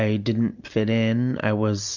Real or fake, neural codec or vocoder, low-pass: real; none; 7.2 kHz